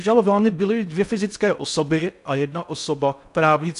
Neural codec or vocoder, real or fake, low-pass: codec, 16 kHz in and 24 kHz out, 0.6 kbps, FocalCodec, streaming, 4096 codes; fake; 10.8 kHz